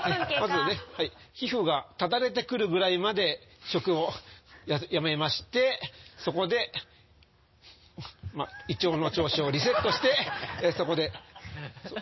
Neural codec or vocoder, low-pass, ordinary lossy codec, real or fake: none; 7.2 kHz; MP3, 24 kbps; real